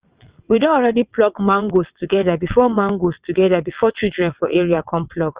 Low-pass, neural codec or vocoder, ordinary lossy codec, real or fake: 3.6 kHz; vocoder, 22.05 kHz, 80 mel bands, WaveNeXt; Opus, 64 kbps; fake